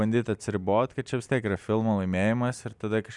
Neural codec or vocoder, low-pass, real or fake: none; 10.8 kHz; real